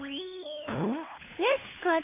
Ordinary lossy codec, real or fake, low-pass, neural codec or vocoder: AAC, 24 kbps; fake; 3.6 kHz; codec, 16 kHz, 4 kbps, FunCodec, trained on LibriTTS, 50 frames a second